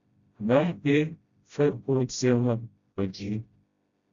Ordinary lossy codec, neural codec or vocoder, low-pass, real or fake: Opus, 64 kbps; codec, 16 kHz, 0.5 kbps, FreqCodec, smaller model; 7.2 kHz; fake